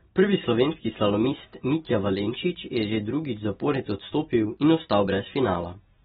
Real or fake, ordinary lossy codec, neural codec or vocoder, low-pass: real; AAC, 16 kbps; none; 19.8 kHz